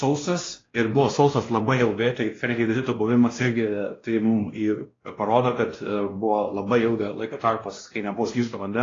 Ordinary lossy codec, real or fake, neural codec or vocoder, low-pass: AAC, 32 kbps; fake; codec, 16 kHz, 1 kbps, X-Codec, WavLM features, trained on Multilingual LibriSpeech; 7.2 kHz